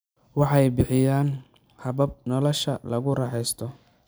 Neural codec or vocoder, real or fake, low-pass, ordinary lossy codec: none; real; none; none